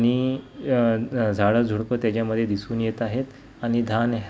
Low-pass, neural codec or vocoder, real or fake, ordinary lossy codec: none; none; real; none